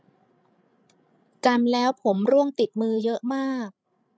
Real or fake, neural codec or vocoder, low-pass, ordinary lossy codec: fake; codec, 16 kHz, 16 kbps, FreqCodec, larger model; none; none